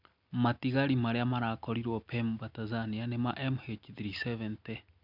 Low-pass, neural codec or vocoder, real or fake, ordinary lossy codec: 5.4 kHz; none; real; none